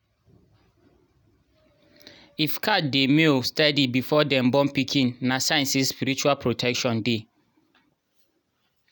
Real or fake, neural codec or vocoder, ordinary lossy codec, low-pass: real; none; none; none